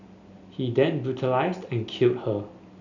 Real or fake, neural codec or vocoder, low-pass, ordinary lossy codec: real; none; 7.2 kHz; none